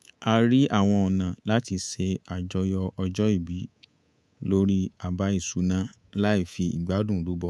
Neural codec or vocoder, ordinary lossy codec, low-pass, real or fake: codec, 24 kHz, 3.1 kbps, DualCodec; none; none; fake